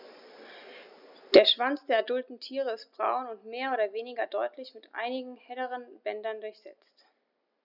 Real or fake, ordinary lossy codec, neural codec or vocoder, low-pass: real; none; none; 5.4 kHz